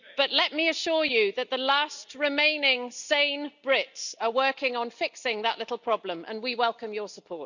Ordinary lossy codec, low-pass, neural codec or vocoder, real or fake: none; 7.2 kHz; none; real